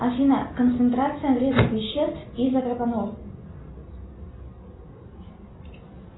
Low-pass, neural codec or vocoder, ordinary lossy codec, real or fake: 7.2 kHz; none; AAC, 16 kbps; real